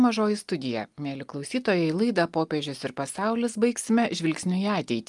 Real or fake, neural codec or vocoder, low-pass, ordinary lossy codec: real; none; 10.8 kHz; Opus, 32 kbps